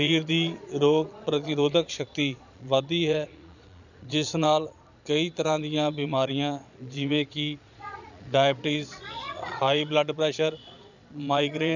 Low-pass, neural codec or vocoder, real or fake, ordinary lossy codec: 7.2 kHz; vocoder, 22.05 kHz, 80 mel bands, Vocos; fake; none